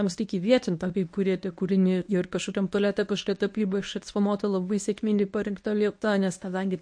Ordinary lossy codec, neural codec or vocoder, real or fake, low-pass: MP3, 48 kbps; codec, 24 kHz, 0.9 kbps, WavTokenizer, medium speech release version 1; fake; 9.9 kHz